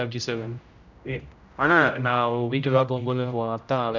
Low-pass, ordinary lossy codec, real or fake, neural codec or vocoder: 7.2 kHz; none; fake; codec, 16 kHz, 0.5 kbps, X-Codec, HuBERT features, trained on general audio